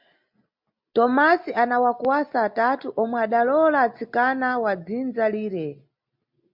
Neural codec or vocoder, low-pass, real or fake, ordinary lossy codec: none; 5.4 kHz; real; MP3, 48 kbps